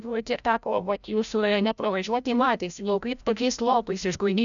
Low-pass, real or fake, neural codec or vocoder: 7.2 kHz; fake; codec, 16 kHz, 0.5 kbps, FreqCodec, larger model